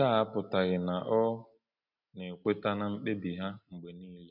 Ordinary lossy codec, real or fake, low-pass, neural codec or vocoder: none; real; 5.4 kHz; none